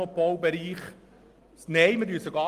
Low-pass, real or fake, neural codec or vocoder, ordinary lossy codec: 14.4 kHz; real; none; Opus, 24 kbps